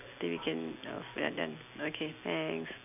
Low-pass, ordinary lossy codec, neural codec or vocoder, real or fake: 3.6 kHz; none; none; real